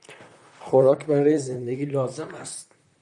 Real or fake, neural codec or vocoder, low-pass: fake; vocoder, 44.1 kHz, 128 mel bands, Pupu-Vocoder; 10.8 kHz